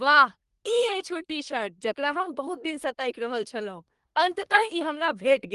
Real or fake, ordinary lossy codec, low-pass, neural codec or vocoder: fake; Opus, 24 kbps; 10.8 kHz; codec, 24 kHz, 1 kbps, SNAC